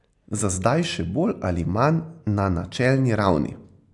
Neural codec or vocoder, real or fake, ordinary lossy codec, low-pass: none; real; AAC, 64 kbps; 10.8 kHz